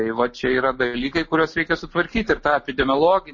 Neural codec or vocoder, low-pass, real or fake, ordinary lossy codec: none; 7.2 kHz; real; MP3, 32 kbps